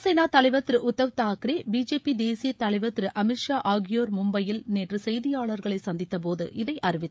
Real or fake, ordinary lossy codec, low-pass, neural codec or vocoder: fake; none; none; codec, 16 kHz, 16 kbps, FreqCodec, smaller model